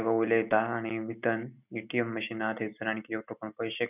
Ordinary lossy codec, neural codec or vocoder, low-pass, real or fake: none; none; 3.6 kHz; real